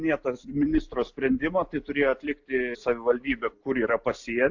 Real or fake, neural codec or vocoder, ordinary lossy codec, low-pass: real; none; AAC, 48 kbps; 7.2 kHz